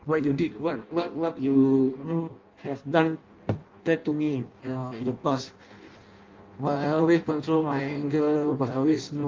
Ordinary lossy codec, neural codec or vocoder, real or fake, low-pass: Opus, 32 kbps; codec, 16 kHz in and 24 kHz out, 0.6 kbps, FireRedTTS-2 codec; fake; 7.2 kHz